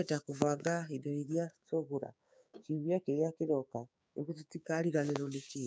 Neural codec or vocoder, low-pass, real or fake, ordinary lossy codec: codec, 16 kHz, 6 kbps, DAC; none; fake; none